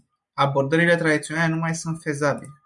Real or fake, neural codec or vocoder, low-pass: real; none; 10.8 kHz